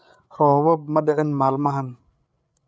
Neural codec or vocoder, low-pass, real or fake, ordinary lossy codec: codec, 16 kHz, 8 kbps, FreqCodec, larger model; none; fake; none